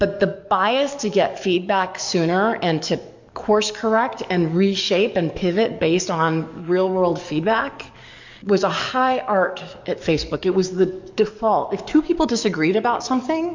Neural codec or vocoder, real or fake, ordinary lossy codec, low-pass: codec, 44.1 kHz, 7.8 kbps, DAC; fake; MP3, 64 kbps; 7.2 kHz